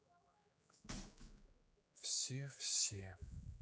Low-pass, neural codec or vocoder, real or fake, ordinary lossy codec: none; codec, 16 kHz, 4 kbps, X-Codec, HuBERT features, trained on general audio; fake; none